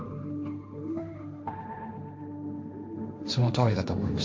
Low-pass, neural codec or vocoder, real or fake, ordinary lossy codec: none; codec, 16 kHz, 1.1 kbps, Voila-Tokenizer; fake; none